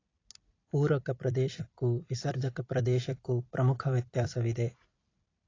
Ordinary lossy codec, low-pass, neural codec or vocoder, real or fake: AAC, 32 kbps; 7.2 kHz; vocoder, 44.1 kHz, 128 mel bands every 256 samples, BigVGAN v2; fake